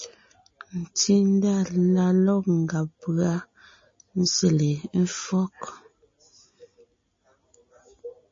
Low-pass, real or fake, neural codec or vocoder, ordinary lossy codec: 7.2 kHz; real; none; MP3, 32 kbps